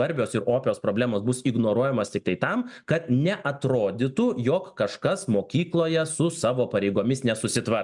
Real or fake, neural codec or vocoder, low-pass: real; none; 10.8 kHz